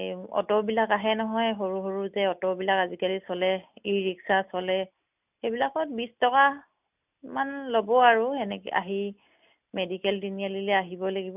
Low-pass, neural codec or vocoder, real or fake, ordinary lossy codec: 3.6 kHz; none; real; none